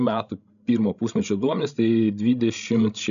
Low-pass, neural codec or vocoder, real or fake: 7.2 kHz; codec, 16 kHz, 16 kbps, FreqCodec, larger model; fake